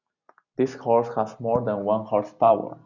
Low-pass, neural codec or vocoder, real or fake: 7.2 kHz; none; real